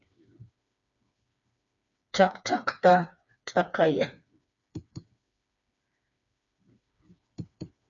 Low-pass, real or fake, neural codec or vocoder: 7.2 kHz; fake; codec, 16 kHz, 4 kbps, FreqCodec, smaller model